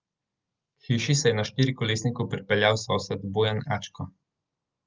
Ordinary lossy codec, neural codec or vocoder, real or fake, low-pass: Opus, 24 kbps; none; real; 7.2 kHz